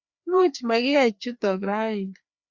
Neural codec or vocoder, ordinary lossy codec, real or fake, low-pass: codec, 16 kHz, 2 kbps, FreqCodec, larger model; Opus, 64 kbps; fake; 7.2 kHz